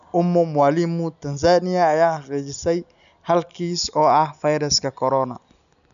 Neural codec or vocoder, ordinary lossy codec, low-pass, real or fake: none; none; 7.2 kHz; real